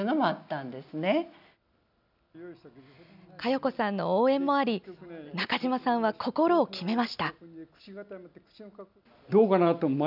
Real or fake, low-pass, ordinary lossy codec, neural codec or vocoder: real; 5.4 kHz; none; none